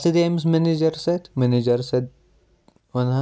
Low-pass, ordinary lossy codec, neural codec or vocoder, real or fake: none; none; none; real